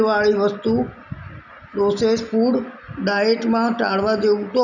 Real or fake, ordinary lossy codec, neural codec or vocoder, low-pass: real; none; none; 7.2 kHz